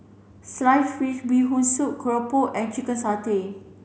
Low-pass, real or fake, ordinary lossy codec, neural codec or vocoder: none; real; none; none